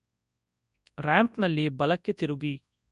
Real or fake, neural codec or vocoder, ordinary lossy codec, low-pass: fake; codec, 24 kHz, 0.9 kbps, WavTokenizer, large speech release; none; 10.8 kHz